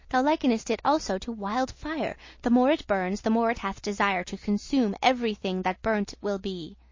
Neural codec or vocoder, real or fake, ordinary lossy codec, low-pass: none; real; MP3, 32 kbps; 7.2 kHz